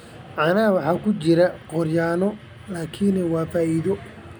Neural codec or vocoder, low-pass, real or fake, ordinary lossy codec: none; none; real; none